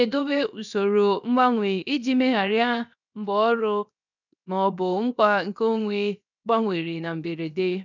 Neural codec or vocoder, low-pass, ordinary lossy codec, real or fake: codec, 16 kHz, 0.7 kbps, FocalCodec; 7.2 kHz; none; fake